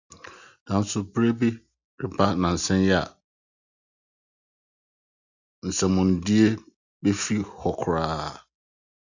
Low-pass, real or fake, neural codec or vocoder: 7.2 kHz; real; none